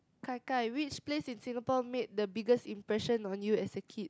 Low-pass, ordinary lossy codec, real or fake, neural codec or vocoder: none; none; real; none